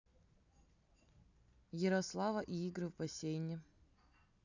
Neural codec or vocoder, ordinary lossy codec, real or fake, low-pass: none; none; real; 7.2 kHz